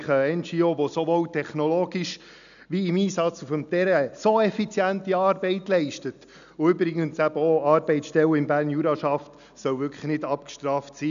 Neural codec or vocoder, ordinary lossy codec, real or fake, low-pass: none; none; real; 7.2 kHz